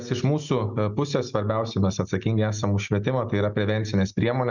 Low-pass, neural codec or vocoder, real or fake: 7.2 kHz; none; real